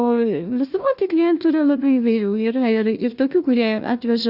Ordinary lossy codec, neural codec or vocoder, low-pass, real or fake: Opus, 64 kbps; codec, 16 kHz, 1 kbps, FunCodec, trained on Chinese and English, 50 frames a second; 5.4 kHz; fake